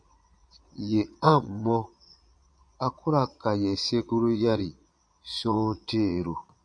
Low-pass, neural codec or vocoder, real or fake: 9.9 kHz; vocoder, 22.05 kHz, 80 mel bands, Vocos; fake